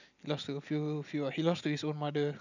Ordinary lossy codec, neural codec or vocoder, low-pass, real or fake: none; none; 7.2 kHz; real